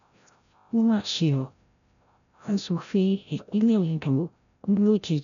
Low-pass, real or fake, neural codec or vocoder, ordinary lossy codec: 7.2 kHz; fake; codec, 16 kHz, 0.5 kbps, FreqCodec, larger model; none